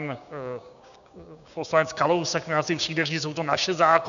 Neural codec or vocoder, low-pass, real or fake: codec, 16 kHz, 6 kbps, DAC; 7.2 kHz; fake